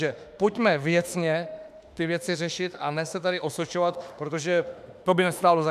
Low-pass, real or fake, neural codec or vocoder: 14.4 kHz; fake; autoencoder, 48 kHz, 32 numbers a frame, DAC-VAE, trained on Japanese speech